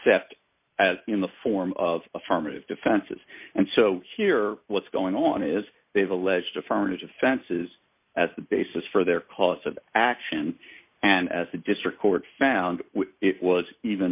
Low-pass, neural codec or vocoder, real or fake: 3.6 kHz; none; real